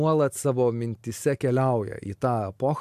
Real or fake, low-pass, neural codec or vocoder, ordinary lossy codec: real; 14.4 kHz; none; AAC, 96 kbps